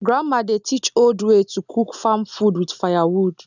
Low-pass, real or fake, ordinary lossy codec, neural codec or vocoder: 7.2 kHz; real; none; none